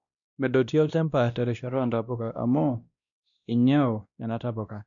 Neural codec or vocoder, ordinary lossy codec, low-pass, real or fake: codec, 16 kHz, 1 kbps, X-Codec, WavLM features, trained on Multilingual LibriSpeech; none; 7.2 kHz; fake